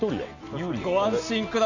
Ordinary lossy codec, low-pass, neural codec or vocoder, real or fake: none; 7.2 kHz; none; real